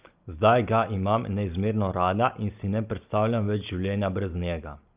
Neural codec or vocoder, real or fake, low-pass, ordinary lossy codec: none; real; 3.6 kHz; Opus, 24 kbps